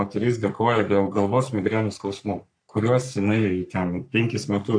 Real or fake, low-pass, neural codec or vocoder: fake; 9.9 kHz; codec, 44.1 kHz, 3.4 kbps, Pupu-Codec